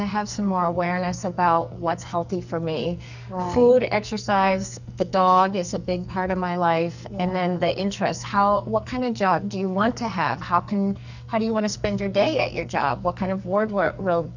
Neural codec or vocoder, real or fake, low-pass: codec, 44.1 kHz, 2.6 kbps, SNAC; fake; 7.2 kHz